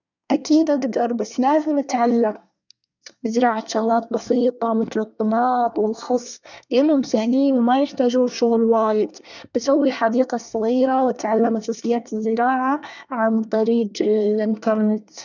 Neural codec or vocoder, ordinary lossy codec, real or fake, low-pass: codec, 24 kHz, 1 kbps, SNAC; none; fake; 7.2 kHz